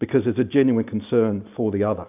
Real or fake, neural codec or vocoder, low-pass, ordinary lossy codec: real; none; 3.6 kHz; AAC, 32 kbps